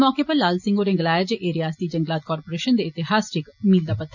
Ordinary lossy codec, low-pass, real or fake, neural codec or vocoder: none; 7.2 kHz; real; none